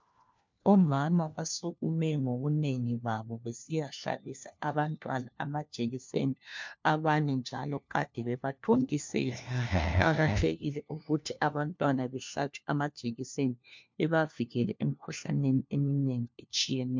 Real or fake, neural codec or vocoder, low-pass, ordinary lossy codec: fake; codec, 16 kHz, 1 kbps, FunCodec, trained on LibriTTS, 50 frames a second; 7.2 kHz; MP3, 64 kbps